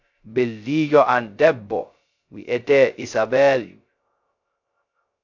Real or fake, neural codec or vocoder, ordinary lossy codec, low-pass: fake; codec, 16 kHz, 0.2 kbps, FocalCodec; AAC, 48 kbps; 7.2 kHz